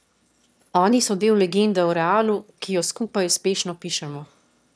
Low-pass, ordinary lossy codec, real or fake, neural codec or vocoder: none; none; fake; autoencoder, 22.05 kHz, a latent of 192 numbers a frame, VITS, trained on one speaker